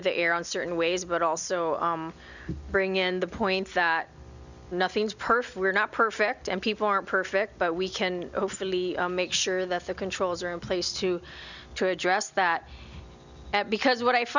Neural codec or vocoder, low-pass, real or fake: none; 7.2 kHz; real